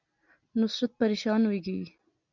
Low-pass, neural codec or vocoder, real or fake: 7.2 kHz; none; real